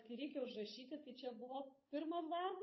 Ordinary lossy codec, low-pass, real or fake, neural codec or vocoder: MP3, 24 kbps; 7.2 kHz; fake; codec, 16 kHz, 8 kbps, FunCodec, trained on Chinese and English, 25 frames a second